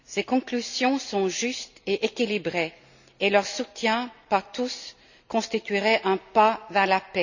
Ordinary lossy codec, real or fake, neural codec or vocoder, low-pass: none; real; none; 7.2 kHz